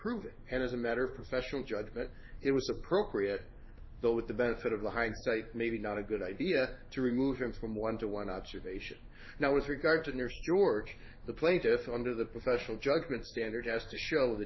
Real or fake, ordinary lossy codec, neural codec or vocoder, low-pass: fake; MP3, 24 kbps; codec, 16 kHz in and 24 kHz out, 1 kbps, XY-Tokenizer; 7.2 kHz